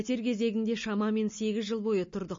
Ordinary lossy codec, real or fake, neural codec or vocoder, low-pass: MP3, 32 kbps; real; none; 7.2 kHz